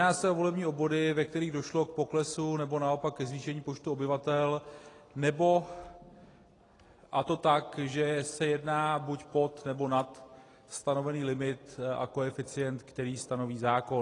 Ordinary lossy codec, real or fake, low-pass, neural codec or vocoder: AAC, 32 kbps; real; 10.8 kHz; none